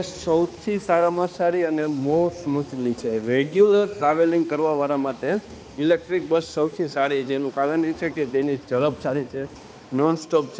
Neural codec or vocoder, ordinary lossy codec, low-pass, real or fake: codec, 16 kHz, 2 kbps, X-Codec, HuBERT features, trained on balanced general audio; none; none; fake